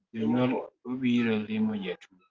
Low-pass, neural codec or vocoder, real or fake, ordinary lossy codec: 7.2 kHz; none; real; Opus, 24 kbps